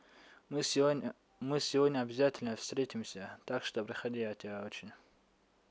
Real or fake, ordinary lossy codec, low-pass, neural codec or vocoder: real; none; none; none